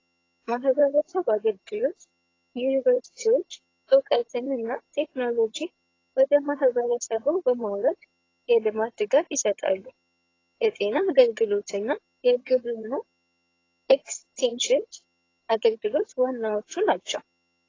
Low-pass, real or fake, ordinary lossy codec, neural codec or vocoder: 7.2 kHz; fake; AAC, 32 kbps; vocoder, 22.05 kHz, 80 mel bands, HiFi-GAN